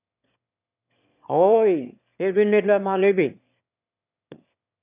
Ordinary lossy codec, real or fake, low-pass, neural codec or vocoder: none; fake; 3.6 kHz; autoencoder, 22.05 kHz, a latent of 192 numbers a frame, VITS, trained on one speaker